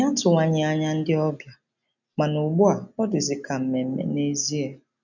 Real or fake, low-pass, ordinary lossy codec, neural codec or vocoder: real; 7.2 kHz; none; none